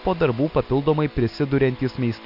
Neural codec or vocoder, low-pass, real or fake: none; 5.4 kHz; real